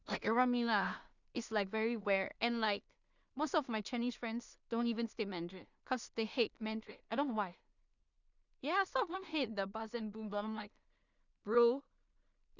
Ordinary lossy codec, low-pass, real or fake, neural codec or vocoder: none; 7.2 kHz; fake; codec, 16 kHz in and 24 kHz out, 0.4 kbps, LongCat-Audio-Codec, two codebook decoder